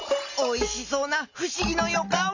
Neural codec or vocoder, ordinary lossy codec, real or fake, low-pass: none; none; real; 7.2 kHz